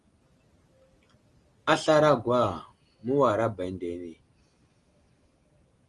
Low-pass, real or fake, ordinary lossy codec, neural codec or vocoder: 10.8 kHz; real; Opus, 32 kbps; none